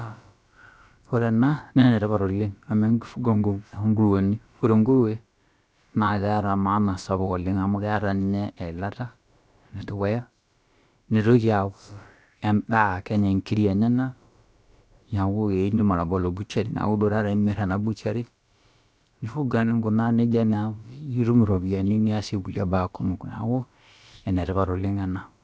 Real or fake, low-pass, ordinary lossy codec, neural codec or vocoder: fake; none; none; codec, 16 kHz, about 1 kbps, DyCAST, with the encoder's durations